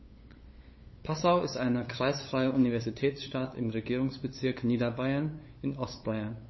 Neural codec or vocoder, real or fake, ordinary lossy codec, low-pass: codec, 16 kHz, 8 kbps, FunCodec, trained on LibriTTS, 25 frames a second; fake; MP3, 24 kbps; 7.2 kHz